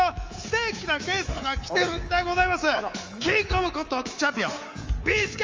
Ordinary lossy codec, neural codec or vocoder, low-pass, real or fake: Opus, 32 kbps; codec, 24 kHz, 3.1 kbps, DualCodec; 7.2 kHz; fake